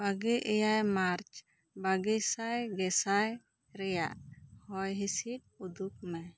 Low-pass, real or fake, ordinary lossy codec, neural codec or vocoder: none; real; none; none